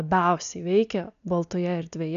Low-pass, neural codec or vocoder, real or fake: 7.2 kHz; none; real